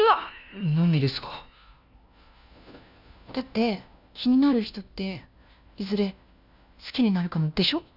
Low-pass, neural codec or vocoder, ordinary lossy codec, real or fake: 5.4 kHz; codec, 16 kHz, 1 kbps, FunCodec, trained on LibriTTS, 50 frames a second; none; fake